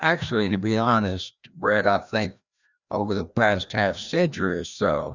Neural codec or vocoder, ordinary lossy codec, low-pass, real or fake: codec, 16 kHz, 1 kbps, FreqCodec, larger model; Opus, 64 kbps; 7.2 kHz; fake